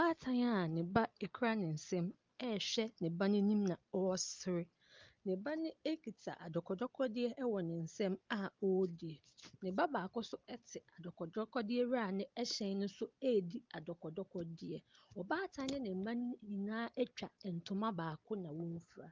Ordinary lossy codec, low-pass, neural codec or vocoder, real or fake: Opus, 32 kbps; 7.2 kHz; none; real